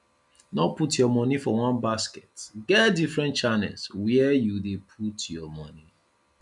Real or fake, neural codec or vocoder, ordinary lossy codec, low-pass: real; none; none; 10.8 kHz